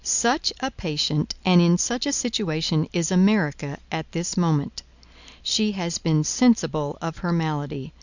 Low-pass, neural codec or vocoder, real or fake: 7.2 kHz; none; real